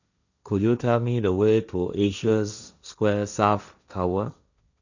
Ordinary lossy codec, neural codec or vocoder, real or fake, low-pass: none; codec, 16 kHz, 1.1 kbps, Voila-Tokenizer; fake; 7.2 kHz